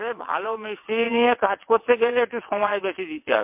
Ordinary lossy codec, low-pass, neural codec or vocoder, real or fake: MP3, 32 kbps; 3.6 kHz; vocoder, 22.05 kHz, 80 mel bands, WaveNeXt; fake